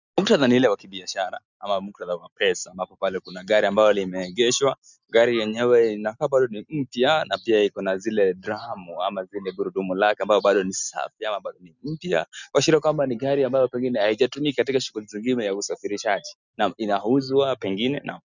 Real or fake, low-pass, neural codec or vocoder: real; 7.2 kHz; none